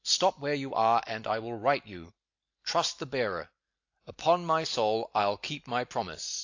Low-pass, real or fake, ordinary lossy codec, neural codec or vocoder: 7.2 kHz; real; AAC, 48 kbps; none